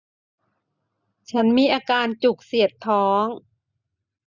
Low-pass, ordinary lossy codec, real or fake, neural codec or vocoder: 7.2 kHz; none; real; none